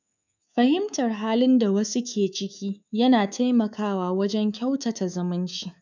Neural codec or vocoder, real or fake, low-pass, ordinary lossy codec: codec, 24 kHz, 3.1 kbps, DualCodec; fake; 7.2 kHz; none